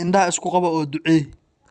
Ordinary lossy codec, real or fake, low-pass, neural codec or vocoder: Opus, 64 kbps; fake; 10.8 kHz; vocoder, 44.1 kHz, 128 mel bands every 256 samples, BigVGAN v2